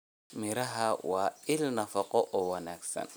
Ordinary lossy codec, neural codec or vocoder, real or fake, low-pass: none; none; real; none